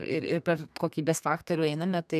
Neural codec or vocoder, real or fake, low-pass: codec, 32 kHz, 1.9 kbps, SNAC; fake; 14.4 kHz